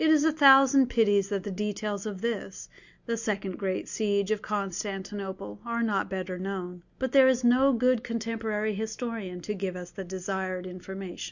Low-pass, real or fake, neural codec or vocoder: 7.2 kHz; real; none